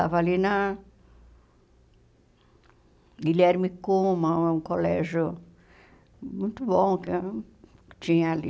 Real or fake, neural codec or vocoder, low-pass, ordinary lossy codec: real; none; none; none